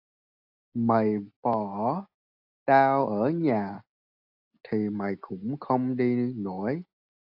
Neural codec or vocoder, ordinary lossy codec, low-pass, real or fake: none; AAC, 48 kbps; 5.4 kHz; real